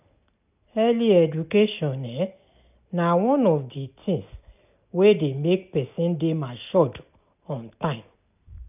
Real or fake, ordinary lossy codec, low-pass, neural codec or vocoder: real; none; 3.6 kHz; none